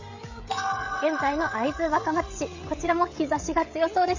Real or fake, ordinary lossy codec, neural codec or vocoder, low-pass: fake; MP3, 48 kbps; codec, 16 kHz, 16 kbps, FreqCodec, smaller model; 7.2 kHz